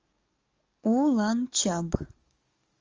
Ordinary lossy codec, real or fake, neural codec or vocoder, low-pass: Opus, 32 kbps; fake; codec, 44.1 kHz, 7.8 kbps, DAC; 7.2 kHz